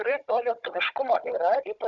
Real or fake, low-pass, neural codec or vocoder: fake; 7.2 kHz; codec, 16 kHz, 16 kbps, FunCodec, trained on Chinese and English, 50 frames a second